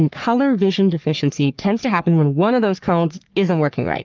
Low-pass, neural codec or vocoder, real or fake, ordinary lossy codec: 7.2 kHz; codec, 44.1 kHz, 3.4 kbps, Pupu-Codec; fake; Opus, 24 kbps